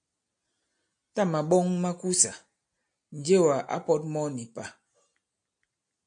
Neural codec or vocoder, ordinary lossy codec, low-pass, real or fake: none; AAC, 64 kbps; 9.9 kHz; real